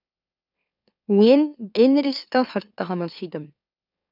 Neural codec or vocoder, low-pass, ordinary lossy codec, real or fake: autoencoder, 44.1 kHz, a latent of 192 numbers a frame, MeloTTS; 5.4 kHz; none; fake